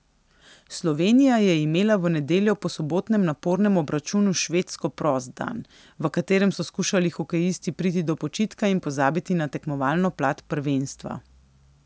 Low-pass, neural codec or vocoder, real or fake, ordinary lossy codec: none; none; real; none